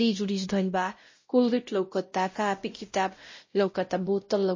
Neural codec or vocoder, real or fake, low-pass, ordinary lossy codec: codec, 16 kHz, 0.5 kbps, X-Codec, WavLM features, trained on Multilingual LibriSpeech; fake; 7.2 kHz; MP3, 32 kbps